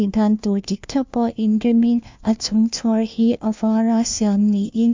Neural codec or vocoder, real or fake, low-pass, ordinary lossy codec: codec, 16 kHz, 1 kbps, FunCodec, trained on LibriTTS, 50 frames a second; fake; 7.2 kHz; MP3, 64 kbps